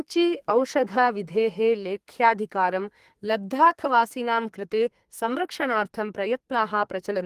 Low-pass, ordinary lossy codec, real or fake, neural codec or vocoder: 14.4 kHz; Opus, 24 kbps; fake; codec, 32 kHz, 1.9 kbps, SNAC